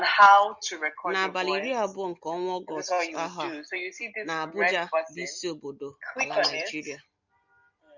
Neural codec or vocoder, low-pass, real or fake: none; 7.2 kHz; real